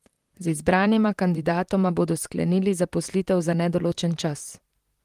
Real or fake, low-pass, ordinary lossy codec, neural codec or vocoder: fake; 14.4 kHz; Opus, 24 kbps; vocoder, 44.1 kHz, 128 mel bands, Pupu-Vocoder